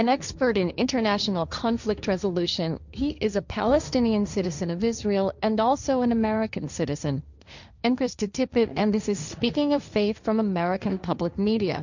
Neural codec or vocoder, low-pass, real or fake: codec, 16 kHz, 1.1 kbps, Voila-Tokenizer; 7.2 kHz; fake